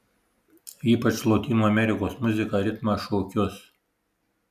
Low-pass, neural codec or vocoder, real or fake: 14.4 kHz; none; real